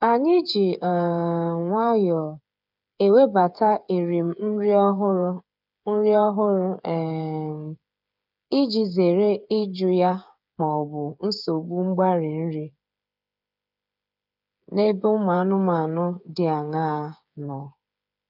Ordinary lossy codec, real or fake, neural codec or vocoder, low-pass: none; fake; codec, 16 kHz, 8 kbps, FreqCodec, smaller model; 5.4 kHz